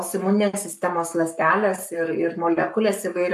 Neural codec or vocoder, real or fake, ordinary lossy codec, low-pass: codec, 44.1 kHz, 7.8 kbps, Pupu-Codec; fake; MP3, 96 kbps; 14.4 kHz